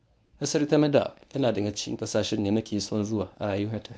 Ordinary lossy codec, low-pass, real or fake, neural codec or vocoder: none; 9.9 kHz; fake; codec, 24 kHz, 0.9 kbps, WavTokenizer, medium speech release version 1